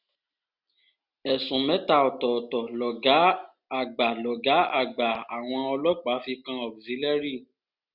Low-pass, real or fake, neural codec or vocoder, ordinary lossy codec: 5.4 kHz; real; none; none